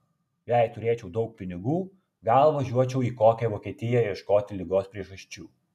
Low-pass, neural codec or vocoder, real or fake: 14.4 kHz; none; real